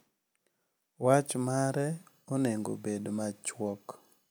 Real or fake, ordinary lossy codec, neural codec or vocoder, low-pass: real; none; none; none